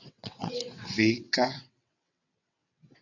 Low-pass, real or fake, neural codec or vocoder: 7.2 kHz; fake; vocoder, 22.05 kHz, 80 mel bands, WaveNeXt